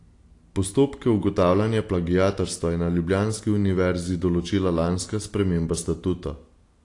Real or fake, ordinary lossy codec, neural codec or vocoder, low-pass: real; AAC, 48 kbps; none; 10.8 kHz